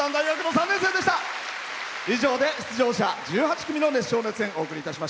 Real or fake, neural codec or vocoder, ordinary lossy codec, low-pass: real; none; none; none